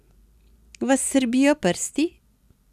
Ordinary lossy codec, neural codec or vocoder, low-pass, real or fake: none; none; 14.4 kHz; real